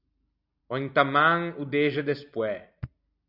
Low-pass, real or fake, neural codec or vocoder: 5.4 kHz; real; none